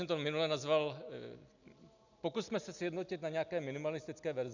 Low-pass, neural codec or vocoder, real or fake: 7.2 kHz; none; real